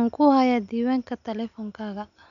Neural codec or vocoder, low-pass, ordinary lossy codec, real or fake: none; 7.2 kHz; none; real